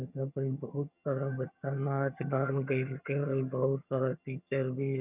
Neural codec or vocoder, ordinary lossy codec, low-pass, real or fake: vocoder, 22.05 kHz, 80 mel bands, HiFi-GAN; none; 3.6 kHz; fake